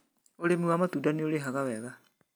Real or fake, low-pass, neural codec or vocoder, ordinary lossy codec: real; none; none; none